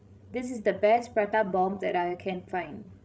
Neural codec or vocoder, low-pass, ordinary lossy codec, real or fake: codec, 16 kHz, 16 kbps, FreqCodec, larger model; none; none; fake